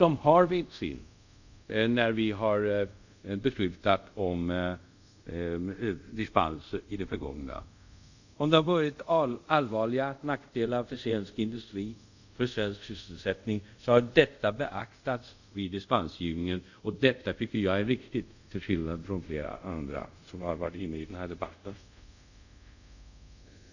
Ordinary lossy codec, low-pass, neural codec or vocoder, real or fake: none; 7.2 kHz; codec, 24 kHz, 0.5 kbps, DualCodec; fake